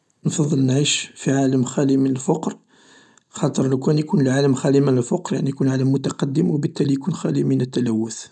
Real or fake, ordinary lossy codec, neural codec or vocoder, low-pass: real; none; none; none